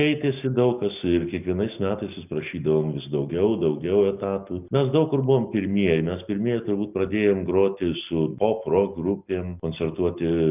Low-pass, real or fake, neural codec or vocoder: 3.6 kHz; real; none